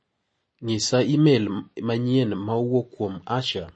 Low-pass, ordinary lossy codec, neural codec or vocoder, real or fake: 9.9 kHz; MP3, 32 kbps; none; real